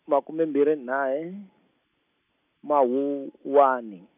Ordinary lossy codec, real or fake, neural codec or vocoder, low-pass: none; real; none; 3.6 kHz